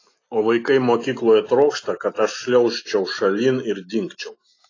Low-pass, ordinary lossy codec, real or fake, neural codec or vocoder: 7.2 kHz; AAC, 32 kbps; real; none